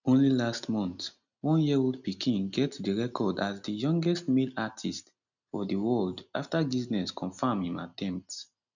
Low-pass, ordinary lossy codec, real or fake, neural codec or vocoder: 7.2 kHz; none; real; none